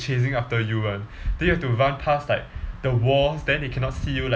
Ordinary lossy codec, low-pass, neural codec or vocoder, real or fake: none; none; none; real